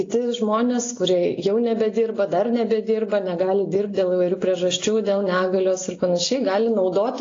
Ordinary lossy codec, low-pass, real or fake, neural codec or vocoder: AAC, 32 kbps; 7.2 kHz; real; none